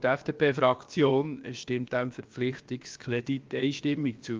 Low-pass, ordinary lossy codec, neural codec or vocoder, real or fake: 7.2 kHz; Opus, 32 kbps; codec, 16 kHz, about 1 kbps, DyCAST, with the encoder's durations; fake